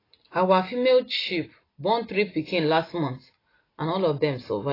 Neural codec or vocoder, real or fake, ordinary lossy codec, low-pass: none; real; AAC, 24 kbps; 5.4 kHz